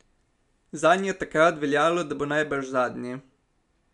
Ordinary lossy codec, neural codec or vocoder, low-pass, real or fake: none; none; 10.8 kHz; real